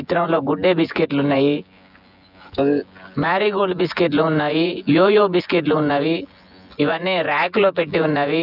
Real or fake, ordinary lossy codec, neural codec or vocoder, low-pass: fake; none; vocoder, 24 kHz, 100 mel bands, Vocos; 5.4 kHz